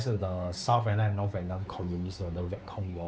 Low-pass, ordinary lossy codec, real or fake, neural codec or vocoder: none; none; fake; codec, 16 kHz, 4 kbps, X-Codec, WavLM features, trained on Multilingual LibriSpeech